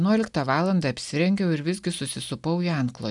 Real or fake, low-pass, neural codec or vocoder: real; 10.8 kHz; none